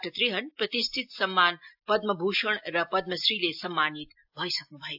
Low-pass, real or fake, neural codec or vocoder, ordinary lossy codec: 5.4 kHz; real; none; AAC, 48 kbps